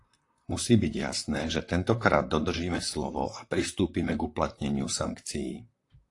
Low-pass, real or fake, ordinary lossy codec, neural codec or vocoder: 10.8 kHz; fake; AAC, 48 kbps; vocoder, 44.1 kHz, 128 mel bands, Pupu-Vocoder